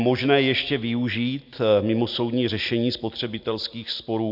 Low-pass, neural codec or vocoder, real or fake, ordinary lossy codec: 5.4 kHz; none; real; MP3, 48 kbps